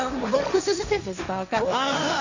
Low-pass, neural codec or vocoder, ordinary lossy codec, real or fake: 7.2 kHz; codec, 16 kHz, 1.1 kbps, Voila-Tokenizer; none; fake